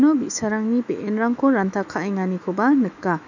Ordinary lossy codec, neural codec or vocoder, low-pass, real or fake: none; autoencoder, 48 kHz, 128 numbers a frame, DAC-VAE, trained on Japanese speech; 7.2 kHz; fake